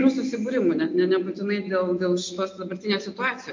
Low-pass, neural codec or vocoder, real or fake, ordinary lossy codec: 7.2 kHz; none; real; MP3, 48 kbps